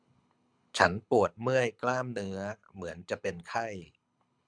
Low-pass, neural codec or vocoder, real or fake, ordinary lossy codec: 9.9 kHz; codec, 24 kHz, 6 kbps, HILCodec; fake; MP3, 96 kbps